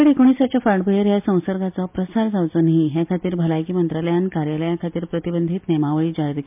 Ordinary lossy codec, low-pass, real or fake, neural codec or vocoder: MP3, 32 kbps; 3.6 kHz; real; none